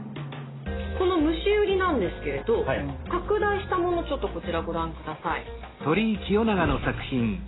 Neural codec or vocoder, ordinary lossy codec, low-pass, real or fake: none; AAC, 16 kbps; 7.2 kHz; real